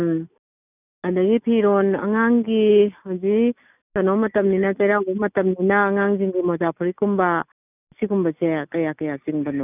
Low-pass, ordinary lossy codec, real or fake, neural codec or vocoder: 3.6 kHz; none; real; none